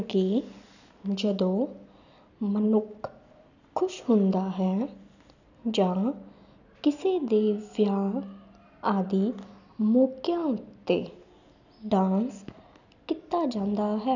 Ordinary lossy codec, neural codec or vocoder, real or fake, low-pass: none; none; real; 7.2 kHz